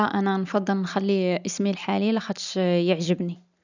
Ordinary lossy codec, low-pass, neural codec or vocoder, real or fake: none; 7.2 kHz; none; real